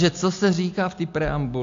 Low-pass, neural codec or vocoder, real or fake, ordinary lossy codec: 7.2 kHz; none; real; AAC, 64 kbps